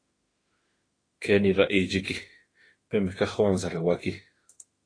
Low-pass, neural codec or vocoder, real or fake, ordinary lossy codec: 9.9 kHz; autoencoder, 48 kHz, 32 numbers a frame, DAC-VAE, trained on Japanese speech; fake; AAC, 32 kbps